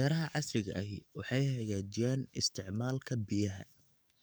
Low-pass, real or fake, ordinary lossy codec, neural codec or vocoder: none; fake; none; codec, 44.1 kHz, 7.8 kbps, DAC